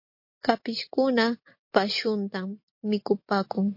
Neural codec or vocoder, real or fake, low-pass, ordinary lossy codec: none; real; 5.4 kHz; MP3, 32 kbps